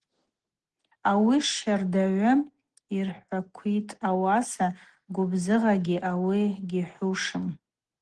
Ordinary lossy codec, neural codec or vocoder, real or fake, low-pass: Opus, 16 kbps; none; real; 9.9 kHz